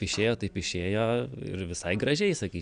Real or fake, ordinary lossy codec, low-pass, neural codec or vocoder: real; AAC, 96 kbps; 9.9 kHz; none